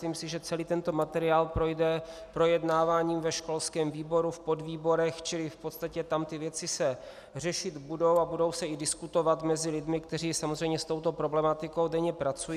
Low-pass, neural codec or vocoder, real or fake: 14.4 kHz; none; real